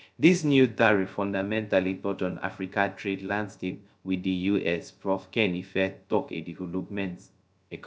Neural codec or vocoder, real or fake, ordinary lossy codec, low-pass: codec, 16 kHz, 0.3 kbps, FocalCodec; fake; none; none